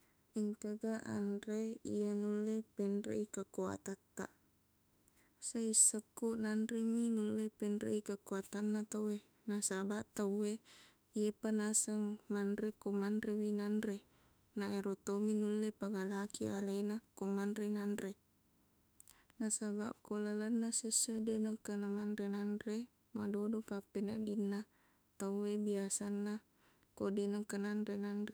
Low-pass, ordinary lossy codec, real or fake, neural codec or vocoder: none; none; fake; autoencoder, 48 kHz, 32 numbers a frame, DAC-VAE, trained on Japanese speech